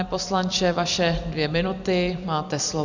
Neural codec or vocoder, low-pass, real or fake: vocoder, 44.1 kHz, 128 mel bands every 256 samples, BigVGAN v2; 7.2 kHz; fake